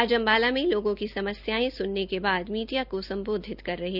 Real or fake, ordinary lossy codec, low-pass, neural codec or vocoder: real; none; 5.4 kHz; none